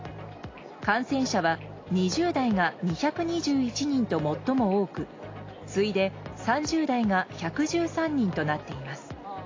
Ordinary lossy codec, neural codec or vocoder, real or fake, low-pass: AAC, 32 kbps; none; real; 7.2 kHz